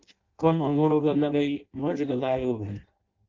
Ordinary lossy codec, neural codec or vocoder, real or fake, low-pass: Opus, 32 kbps; codec, 16 kHz in and 24 kHz out, 0.6 kbps, FireRedTTS-2 codec; fake; 7.2 kHz